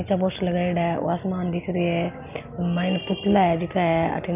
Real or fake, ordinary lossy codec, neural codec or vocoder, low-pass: real; none; none; 3.6 kHz